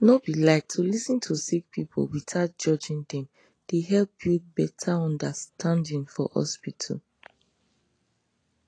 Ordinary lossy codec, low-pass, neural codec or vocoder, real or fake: AAC, 32 kbps; 9.9 kHz; none; real